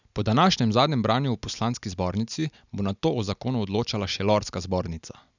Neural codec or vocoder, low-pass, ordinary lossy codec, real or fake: none; 7.2 kHz; none; real